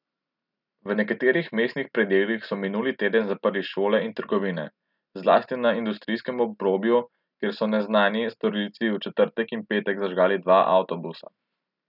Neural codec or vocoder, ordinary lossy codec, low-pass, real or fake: none; none; 5.4 kHz; real